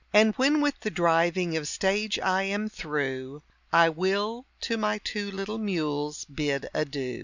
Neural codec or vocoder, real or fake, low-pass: none; real; 7.2 kHz